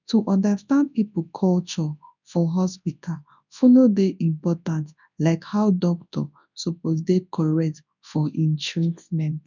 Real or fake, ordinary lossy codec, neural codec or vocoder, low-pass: fake; none; codec, 24 kHz, 0.9 kbps, WavTokenizer, large speech release; 7.2 kHz